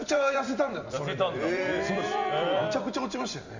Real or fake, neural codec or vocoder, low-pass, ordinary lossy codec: fake; vocoder, 44.1 kHz, 128 mel bands every 256 samples, BigVGAN v2; 7.2 kHz; Opus, 64 kbps